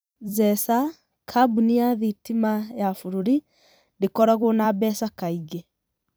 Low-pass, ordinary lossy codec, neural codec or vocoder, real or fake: none; none; vocoder, 44.1 kHz, 128 mel bands every 256 samples, BigVGAN v2; fake